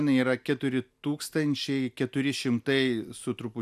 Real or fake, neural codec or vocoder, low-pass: real; none; 14.4 kHz